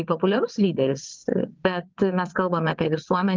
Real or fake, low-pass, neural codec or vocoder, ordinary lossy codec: fake; 7.2 kHz; vocoder, 22.05 kHz, 80 mel bands, WaveNeXt; Opus, 32 kbps